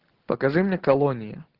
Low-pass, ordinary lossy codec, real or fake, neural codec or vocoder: 5.4 kHz; Opus, 16 kbps; real; none